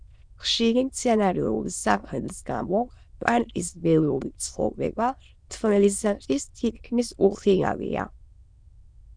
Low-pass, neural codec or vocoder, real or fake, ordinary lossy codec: 9.9 kHz; autoencoder, 22.05 kHz, a latent of 192 numbers a frame, VITS, trained on many speakers; fake; Opus, 64 kbps